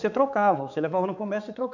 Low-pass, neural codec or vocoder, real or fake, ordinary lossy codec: 7.2 kHz; codec, 16 kHz, 4 kbps, X-Codec, HuBERT features, trained on balanced general audio; fake; none